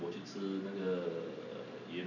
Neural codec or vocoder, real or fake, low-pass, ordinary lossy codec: none; real; 7.2 kHz; none